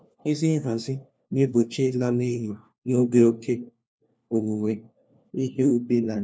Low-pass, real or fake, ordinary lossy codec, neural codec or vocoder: none; fake; none; codec, 16 kHz, 1 kbps, FunCodec, trained on LibriTTS, 50 frames a second